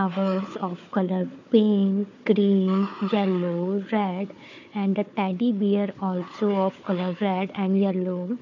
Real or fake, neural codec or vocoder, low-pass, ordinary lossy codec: fake; codec, 16 kHz, 4 kbps, FunCodec, trained on Chinese and English, 50 frames a second; 7.2 kHz; none